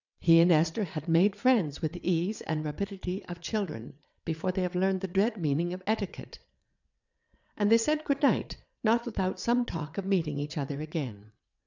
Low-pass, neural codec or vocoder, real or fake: 7.2 kHz; vocoder, 22.05 kHz, 80 mel bands, WaveNeXt; fake